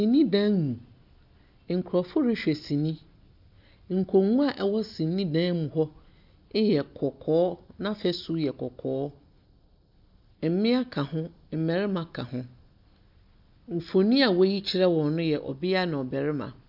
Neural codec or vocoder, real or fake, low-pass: none; real; 5.4 kHz